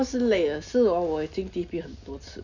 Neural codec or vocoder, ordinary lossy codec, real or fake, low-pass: none; none; real; 7.2 kHz